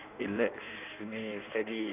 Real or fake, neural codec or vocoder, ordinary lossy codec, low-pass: fake; codec, 16 kHz in and 24 kHz out, 1.1 kbps, FireRedTTS-2 codec; none; 3.6 kHz